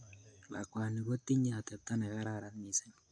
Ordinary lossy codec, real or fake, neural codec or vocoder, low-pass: AAC, 64 kbps; real; none; 9.9 kHz